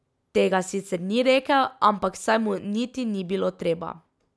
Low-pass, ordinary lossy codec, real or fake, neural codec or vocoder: none; none; real; none